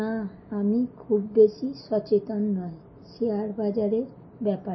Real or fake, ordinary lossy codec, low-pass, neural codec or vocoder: real; MP3, 24 kbps; 7.2 kHz; none